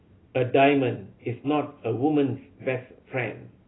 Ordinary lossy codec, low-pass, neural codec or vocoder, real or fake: AAC, 16 kbps; 7.2 kHz; none; real